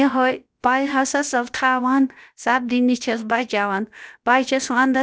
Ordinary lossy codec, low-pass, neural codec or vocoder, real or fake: none; none; codec, 16 kHz, about 1 kbps, DyCAST, with the encoder's durations; fake